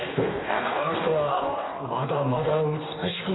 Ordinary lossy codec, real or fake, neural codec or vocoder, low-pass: AAC, 16 kbps; fake; codec, 44.1 kHz, 2.6 kbps, DAC; 7.2 kHz